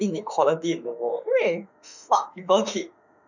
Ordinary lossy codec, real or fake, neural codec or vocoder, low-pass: none; fake; autoencoder, 48 kHz, 32 numbers a frame, DAC-VAE, trained on Japanese speech; 7.2 kHz